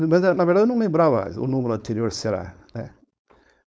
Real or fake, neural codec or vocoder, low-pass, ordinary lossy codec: fake; codec, 16 kHz, 4.8 kbps, FACodec; none; none